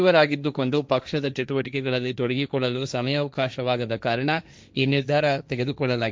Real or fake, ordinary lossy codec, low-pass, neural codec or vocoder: fake; none; none; codec, 16 kHz, 1.1 kbps, Voila-Tokenizer